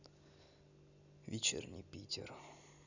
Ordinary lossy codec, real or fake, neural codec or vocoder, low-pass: none; real; none; 7.2 kHz